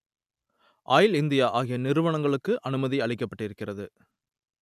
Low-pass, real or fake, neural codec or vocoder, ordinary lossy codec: 14.4 kHz; real; none; none